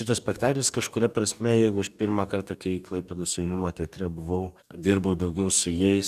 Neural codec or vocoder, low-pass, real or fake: codec, 44.1 kHz, 2.6 kbps, DAC; 14.4 kHz; fake